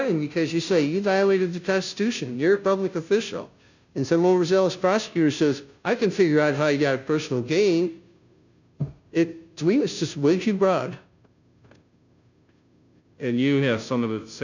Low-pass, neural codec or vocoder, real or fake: 7.2 kHz; codec, 16 kHz, 0.5 kbps, FunCodec, trained on Chinese and English, 25 frames a second; fake